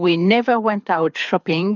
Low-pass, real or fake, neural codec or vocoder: 7.2 kHz; fake; codec, 24 kHz, 6 kbps, HILCodec